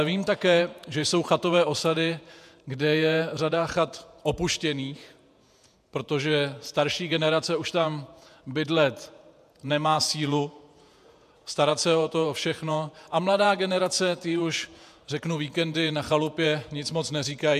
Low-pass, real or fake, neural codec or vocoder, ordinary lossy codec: 14.4 kHz; fake; vocoder, 48 kHz, 128 mel bands, Vocos; MP3, 96 kbps